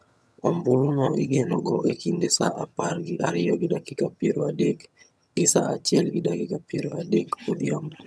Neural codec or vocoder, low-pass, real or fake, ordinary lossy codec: vocoder, 22.05 kHz, 80 mel bands, HiFi-GAN; none; fake; none